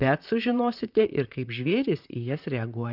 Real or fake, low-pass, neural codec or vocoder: fake; 5.4 kHz; vocoder, 44.1 kHz, 128 mel bands, Pupu-Vocoder